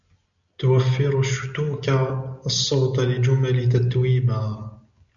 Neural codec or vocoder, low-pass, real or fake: none; 7.2 kHz; real